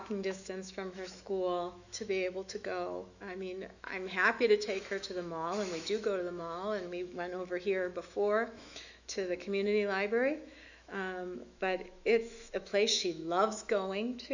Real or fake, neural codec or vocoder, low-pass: fake; autoencoder, 48 kHz, 128 numbers a frame, DAC-VAE, trained on Japanese speech; 7.2 kHz